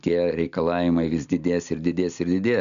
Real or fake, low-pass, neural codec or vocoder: real; 7.2 kHz; none